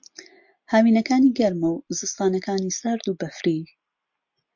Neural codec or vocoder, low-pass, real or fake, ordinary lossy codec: none; 7.2 kHz; real; MP3, 48 kbps